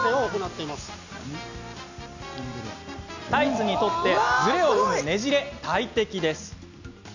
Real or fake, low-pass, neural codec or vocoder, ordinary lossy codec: real; 7.2 kHz; none; none